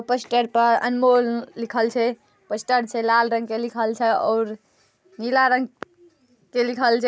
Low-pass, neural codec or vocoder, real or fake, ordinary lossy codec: none; none; real; none